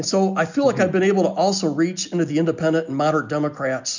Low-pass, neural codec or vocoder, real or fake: 7.2 kHz; none; real